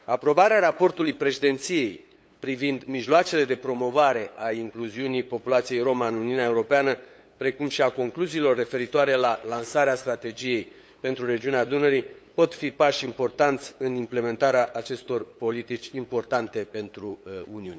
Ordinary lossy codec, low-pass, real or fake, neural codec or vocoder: none; none; fake; codec, 16 kHz, 8 kbps, FunCodec, trained on LibriTTS, 25 frames a second